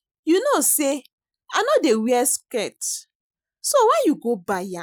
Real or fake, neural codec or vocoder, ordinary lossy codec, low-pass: real; none; none; none